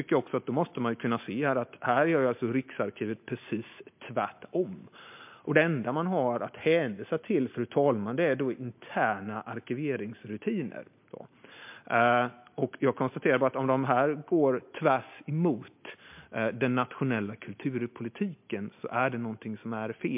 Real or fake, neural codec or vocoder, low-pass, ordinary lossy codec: real; none; 3.6 kHz; MP3, 32 kbps